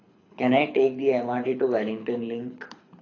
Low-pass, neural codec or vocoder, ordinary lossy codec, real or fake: 7.2 kHz; codec, 24 kHz, 6 kbps, HILCodec; AAC, 32 kbps; fake